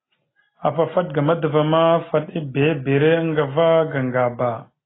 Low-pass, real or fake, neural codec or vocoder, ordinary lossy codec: 7.2 kHz; real; none; AAC, 16 kbps